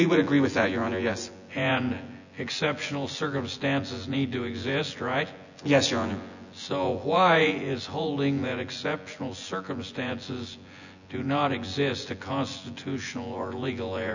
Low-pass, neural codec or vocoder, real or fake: 7.2 kHz; vocoder, 24 kHz, 100 mel bands, Vocos; fake